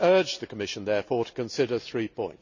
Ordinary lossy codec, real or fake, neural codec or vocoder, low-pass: none; real; none; 7.2 kHz